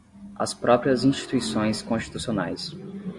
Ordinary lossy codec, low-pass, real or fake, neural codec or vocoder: AAC, 64 kbps; 10.8 kHz; real; none